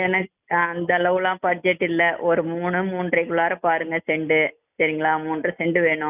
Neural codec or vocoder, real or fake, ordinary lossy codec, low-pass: none; real; none; 3.6 kHz